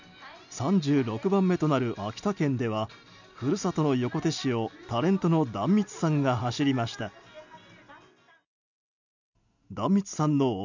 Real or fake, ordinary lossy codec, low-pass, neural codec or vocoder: real; none; 7.2 kHz; none